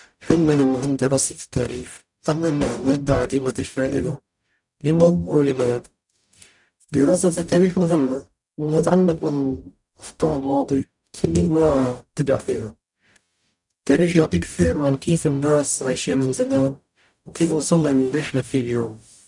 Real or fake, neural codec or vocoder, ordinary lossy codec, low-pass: fake; codec, 44.1 kHz, 0.9 kbps, DAC; none; 10.8 kHz